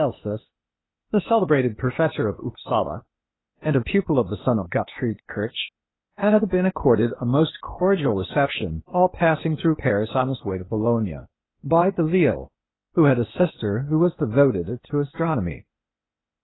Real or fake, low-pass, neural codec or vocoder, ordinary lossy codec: fake; 7.2 kHz; codec, 16 kHz, 0.8 kbps, ZipCodec; AAC, 16 kbps